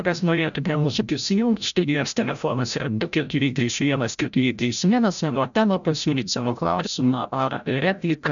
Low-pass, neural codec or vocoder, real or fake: 7.2 kHz; codec, 16 kHz, 0.5 kbps, FreqCodec, larger model; fake